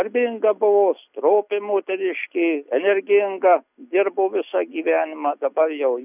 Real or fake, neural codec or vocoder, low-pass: real; none; 3.6 kHz